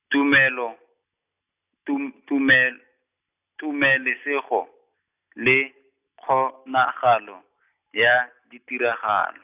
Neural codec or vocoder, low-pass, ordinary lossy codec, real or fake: none; 3.6 kHz; none; real